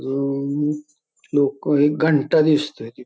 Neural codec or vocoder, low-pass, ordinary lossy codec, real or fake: none; none; none; real